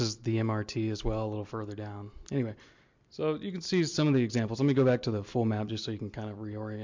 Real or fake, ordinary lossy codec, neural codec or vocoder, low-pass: real; MP3, 64 kbps; none; 7.2 kHz